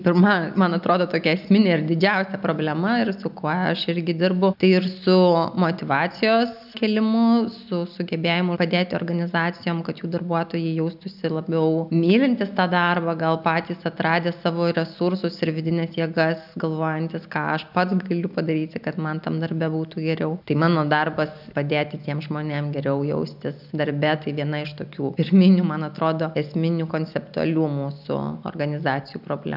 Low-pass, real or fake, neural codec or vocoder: 5.4 kHz; real; none